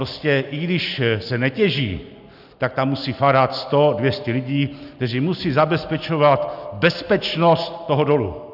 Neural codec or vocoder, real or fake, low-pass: none; real; 5.4 kHz